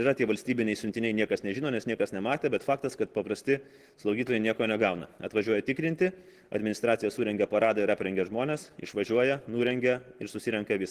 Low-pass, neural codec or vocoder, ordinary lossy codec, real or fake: 14.4 kHz; none; Opus, 16 kbps; real